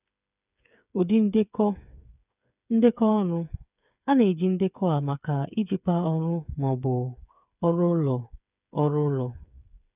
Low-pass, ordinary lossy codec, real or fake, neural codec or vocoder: 3.6 kHz; none; fake; codec, 16 kHz, 8 kbps, FreqCodec, smaller model